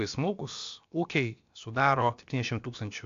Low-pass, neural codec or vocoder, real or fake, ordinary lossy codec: 7.2 kHz; codec, 16 kHz, about 1 kbps, DyCAST, with the encoder's durations; fake; AAC, 48 kbps